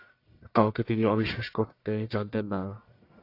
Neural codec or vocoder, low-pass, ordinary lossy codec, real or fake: codec, 44.1 kHz, 1.7 kbps, Pupu-Codec; 5.4 kHz; AAC, 32 kbps; fake